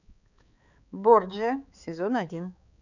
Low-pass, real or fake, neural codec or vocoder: 7.2 kHz; fake; codec, 16 kHz, 4 kbps, X-Codec, HuBERT features, trained on balanced general audio